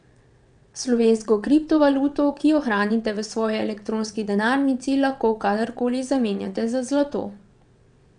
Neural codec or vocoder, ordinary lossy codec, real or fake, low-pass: vocoder, 22.05 kHz, 80 mel bands, WaveNeXt; none; fake; 9.9 kHz